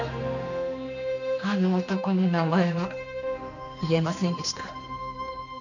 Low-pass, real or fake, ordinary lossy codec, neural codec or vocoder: 7.2 kHz; fake; none; codec, 16 kHz, 2 kbps, X-Codec, HuBERT features, trained on general audio